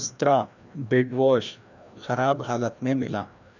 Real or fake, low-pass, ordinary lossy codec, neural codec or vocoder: fake; 7.2 kHz; none; codec, 16 kHz, 1 kbps, FreqCodec, larger model